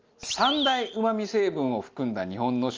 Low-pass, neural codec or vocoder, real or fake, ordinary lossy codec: 7.2 kHz; none; real; Opus, 24 kbps